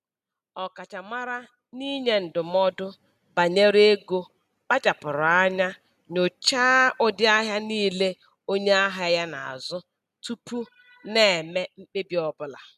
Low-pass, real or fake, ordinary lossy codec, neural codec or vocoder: 14.4 kHz; real; none; none